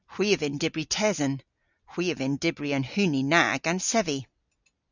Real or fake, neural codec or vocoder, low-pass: real; none; 7.2 kHz